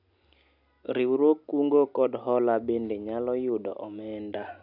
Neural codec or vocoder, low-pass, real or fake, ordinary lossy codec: none; 5.4 kHz; real; none